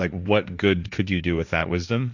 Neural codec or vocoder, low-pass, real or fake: codec, 16 kHz, 1.1 kbps, Voila-Tokenizer; 7.2 kHz; fake